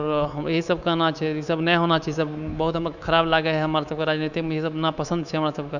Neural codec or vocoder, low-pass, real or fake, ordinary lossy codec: codec, 24 kHz, 3.1 kbps, DualCodec; 7.2 kHz; fake; none